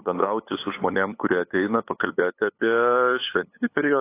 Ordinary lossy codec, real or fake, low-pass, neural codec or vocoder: AAC, 24 kbps; fake; 3.6 kHz; codec, 16 kHz, 8 kbps, FunCodec, trained on LibriTTS, 25 frames a second